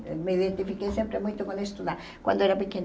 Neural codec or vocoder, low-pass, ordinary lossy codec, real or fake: none; none; none; real